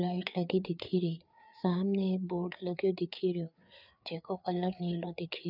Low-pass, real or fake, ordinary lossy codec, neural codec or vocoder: 5.4 kHz; fake; none; codec, 16 kHz, 4 kbps, FreqCodec, larger model